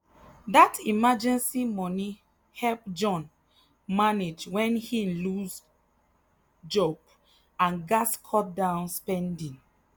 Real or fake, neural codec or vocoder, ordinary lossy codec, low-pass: real; none; none; none